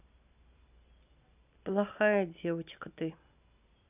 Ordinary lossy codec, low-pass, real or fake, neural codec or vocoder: none; 3.6 kHz; real; none